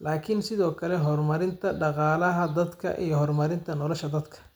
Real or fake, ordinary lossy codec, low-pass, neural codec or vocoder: real; none; none; none